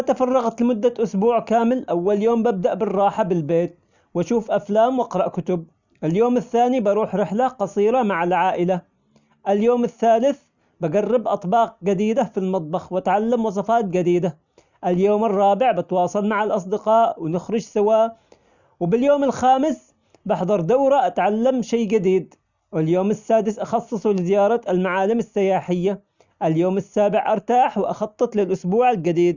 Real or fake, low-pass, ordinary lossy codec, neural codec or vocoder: real; 7.2 kHz; none; none